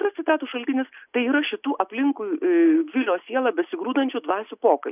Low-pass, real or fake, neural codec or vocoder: 3.6 kHz; real; none